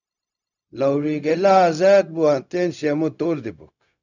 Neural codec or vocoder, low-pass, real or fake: codec, 16 kHz, 0.4 kbps, LongCat-Audio-Codec; 7.2 kHz; fake